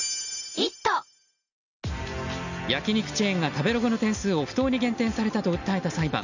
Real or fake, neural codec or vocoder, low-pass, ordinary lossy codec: real; none; 7.2 kHz; none